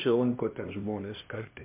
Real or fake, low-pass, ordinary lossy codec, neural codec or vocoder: fake; 3.6 kHz; MP3, 32 kbps; codec, 16 kHz, 1 kbps, X-Codec, HuBERT features, trained on LibriSpeech